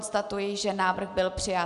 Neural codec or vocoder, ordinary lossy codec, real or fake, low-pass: none; AAC, 96 kbps; real; 10.8 kHz